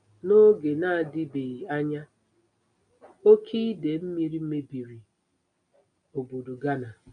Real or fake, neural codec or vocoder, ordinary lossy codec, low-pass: real; none; MP3, 96 kbps; 9.9 kHz